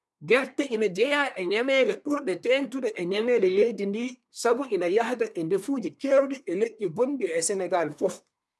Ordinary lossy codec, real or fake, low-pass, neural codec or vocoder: none; fake; none; codec, 24 kHz, 1 kbps, SNAC